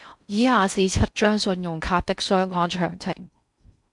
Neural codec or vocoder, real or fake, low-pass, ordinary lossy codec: codec, 16 kHz in and 24 kHz out, 0.6 kbps, FocalCodec, streaming, 4096 codes; fake; 10.8 kHz; MP3, 96 kbps